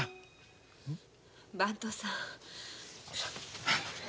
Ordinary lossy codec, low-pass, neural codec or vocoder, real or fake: none; none; none; real